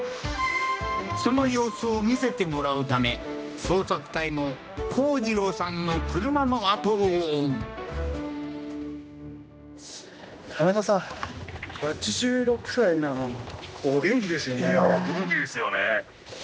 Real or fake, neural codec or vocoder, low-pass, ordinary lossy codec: fake; codec, 16 kHz, 1 kbps, X-Codec, HuBERT features, trained on general audio; none; none